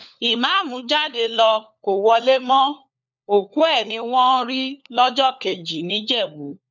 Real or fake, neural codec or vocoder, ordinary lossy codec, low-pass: fake; codec, 16 kHz, 4 kbps, FunCodec, trained on LibriTTS, 50 frames a second; none; 7.2 kHz